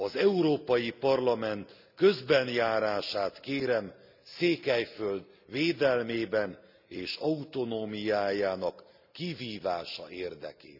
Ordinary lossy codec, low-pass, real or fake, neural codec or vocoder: none; 5.4 kHz; real; none